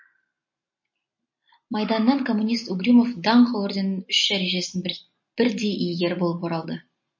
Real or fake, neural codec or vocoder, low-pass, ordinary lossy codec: real; none; 7.2 kHz; MP3, 32 kbps